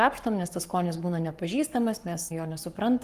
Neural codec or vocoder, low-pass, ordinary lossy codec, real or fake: codec, 44.1 kHz, 7.8 kbps, Pupu-Codec; 14.4 kHz; Opus, 32 kbps; fake